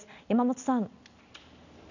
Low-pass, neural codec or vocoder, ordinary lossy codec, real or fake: 7.2 kHz; none; none; real